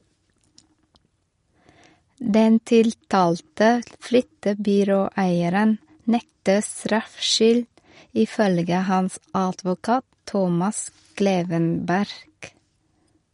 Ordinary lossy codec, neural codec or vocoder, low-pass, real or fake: MP3, 48 kbps; none; 10.8 kHz; real